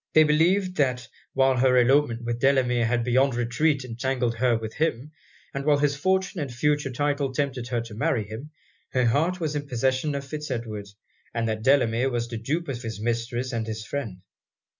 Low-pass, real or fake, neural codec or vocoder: 7.2 kHz; real; none